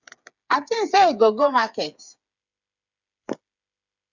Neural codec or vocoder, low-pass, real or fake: codec, 16 kHz, 8 kbps, FreqCodec, smaller model; 7.2 kHz; fake